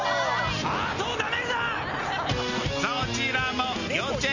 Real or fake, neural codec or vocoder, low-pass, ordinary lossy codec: real; none; 7.2 kHz; none